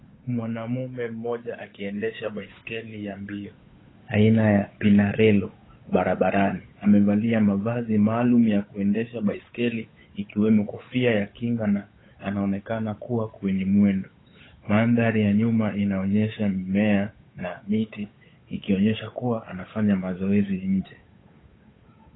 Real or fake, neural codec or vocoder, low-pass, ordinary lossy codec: fake; codec, 16 kHz, 8 kbps, FunCodec, trained on Chinese and English, 25 frames a second; 7.2 kHz; AAC, 16 kbps